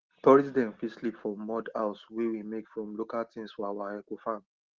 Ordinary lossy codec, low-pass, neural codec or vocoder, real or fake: Opus, 16 kbps; 7.2 kHz; none; real